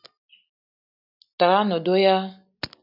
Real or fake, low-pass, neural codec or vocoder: real; 5.4 kHz; none